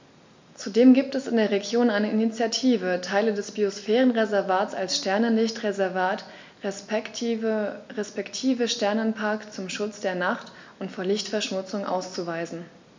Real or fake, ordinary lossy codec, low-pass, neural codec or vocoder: real; MP3, 64 kbps; 7.2 kHz; none